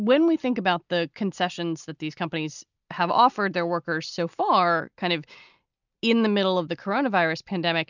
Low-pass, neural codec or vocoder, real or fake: 7.2 kHz; none; real